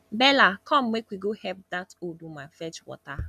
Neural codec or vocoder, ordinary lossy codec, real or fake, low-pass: none; none; real; 14.4 kHz